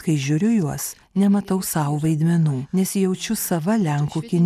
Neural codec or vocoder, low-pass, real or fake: none; 14.4 kHz; real